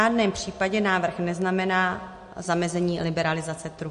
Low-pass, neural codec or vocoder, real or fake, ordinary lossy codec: 14.4 kHz; none; real; MP3, 48 kbps